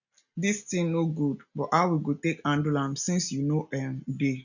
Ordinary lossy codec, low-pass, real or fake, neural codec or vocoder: none; 7.2 kHz; real; none